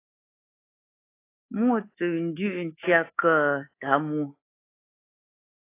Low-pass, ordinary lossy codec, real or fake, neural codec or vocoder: 3.6 kHz; AAC, 24 kbps; fake; codec, 24 kHz, 3.1 kbps, DualCodec